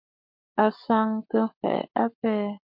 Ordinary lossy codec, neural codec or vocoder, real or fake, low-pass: MP3, 48 kbps; codec, 44.1 kHz, 7.8 kbps, Pupu-Codec; fake; 5.4 kHz